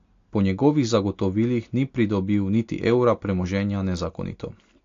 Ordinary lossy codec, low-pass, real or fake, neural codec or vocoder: AAC, 48 kbps; 7.2 kHz; real; none